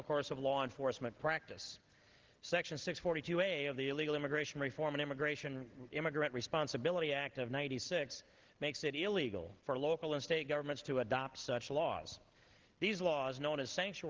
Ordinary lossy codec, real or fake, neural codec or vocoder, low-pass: Opus, 16 kbps; real; none; 7.2 kHz